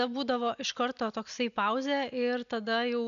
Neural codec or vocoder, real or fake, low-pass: none; real; 7.2 kHz